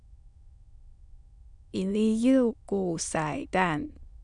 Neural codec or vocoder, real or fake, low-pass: autoencoder, 22.05 kHz, a latent of 192 numbers a frame, VITS, trained on many speakers; fake; 9.9 kHz